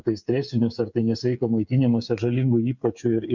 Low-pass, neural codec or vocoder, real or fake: 7.2 kHz; codec, 16 kHz, 8 kbps, FreqCodec, smaller model; fake